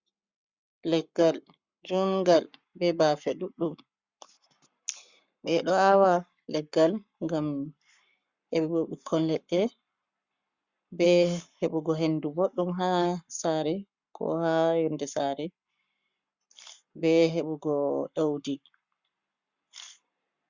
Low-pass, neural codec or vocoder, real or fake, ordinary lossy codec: 7.2 kHz; codec, 44.1 kHz, 7.8 kbps, Pupu-Codec; fake; Opus, 64 kbps